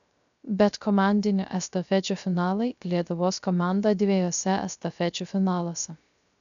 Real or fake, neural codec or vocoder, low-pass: fake; codec, 16 kHz, 0.3 kbps, FocalCodec; 7.2 kHz